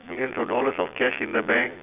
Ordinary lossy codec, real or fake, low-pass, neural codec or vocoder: none; fake; 3.6 kHz; vocoder, 22.05 kHz, 80 mel bands, Vocos